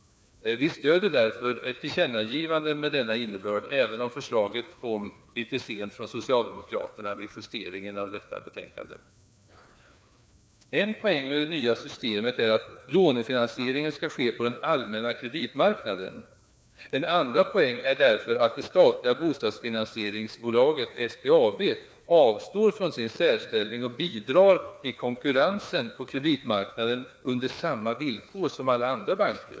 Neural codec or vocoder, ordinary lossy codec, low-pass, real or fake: codec, 16 kHz, 2 kbps, FreqCodec, larger model; none; none; fake